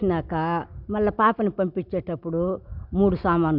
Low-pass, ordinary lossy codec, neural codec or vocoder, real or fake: 5.4 kHz; none; none; real